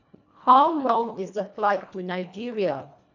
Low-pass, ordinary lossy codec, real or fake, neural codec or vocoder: 7.2 kHz; none; fake; codec, 24 kHz, 1.5 kbps, HILCodec